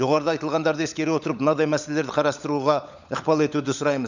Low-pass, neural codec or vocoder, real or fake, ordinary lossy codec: 7.2 kHz; codec, 16 kHz, 16 kbps, FunCodec, trained on LibriTTS, 50 frames a second; fake; none